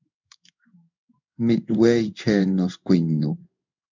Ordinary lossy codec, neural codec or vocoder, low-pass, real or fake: MP3, 64 kbps; codec, 16 kHz in and 24 kHz out, 1 kbps, XY-Tokenizer; 7.2 kHz; fake